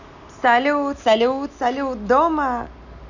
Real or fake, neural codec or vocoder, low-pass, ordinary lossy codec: real; none; 7.2 kHz; none